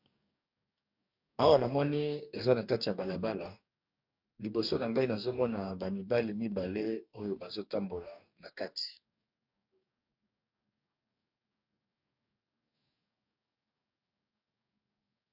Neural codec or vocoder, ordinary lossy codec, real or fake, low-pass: codec, 44.1 kHz, 2.6 kbps, DAC; MP3, 48 kbps; fake; 5.4 kHz